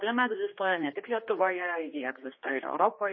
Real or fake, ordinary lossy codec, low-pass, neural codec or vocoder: fake; MP3, 24 kbps; 7.2 kHz; codec, 16 kHz, 2 kbps, X-Codec, HuBERT features, trained on general audio